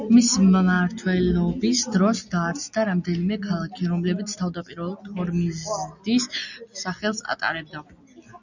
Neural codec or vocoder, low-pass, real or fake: none; 7.2 kHz; real